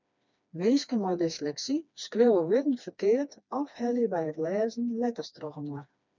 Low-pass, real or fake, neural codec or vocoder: 7.2 kHz; fake; codec, 16 kHz, 2 kbps, FreqCodec, smaller model